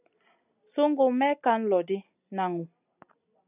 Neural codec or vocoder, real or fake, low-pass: none; real; 3.6 kHz